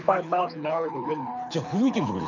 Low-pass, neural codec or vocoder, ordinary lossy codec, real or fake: 7.2 kHz; codec, 24 kHz, 6 kbps, HILCodec; none; fake